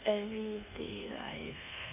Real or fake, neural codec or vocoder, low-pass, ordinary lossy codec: fake; codec, 24 kHz, 1.2 kbps, DualCodec; 3.6 kHz; none